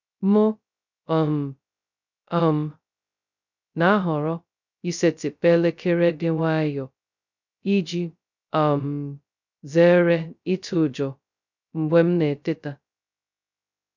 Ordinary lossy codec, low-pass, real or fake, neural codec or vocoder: none; 7.2 kHz; fake; codec, 16 kHz, 0.2 kbps, FocalCodec